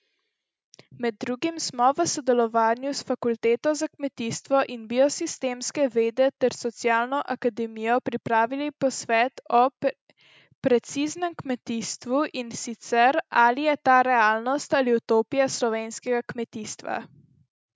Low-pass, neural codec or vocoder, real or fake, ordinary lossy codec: none; none; real; none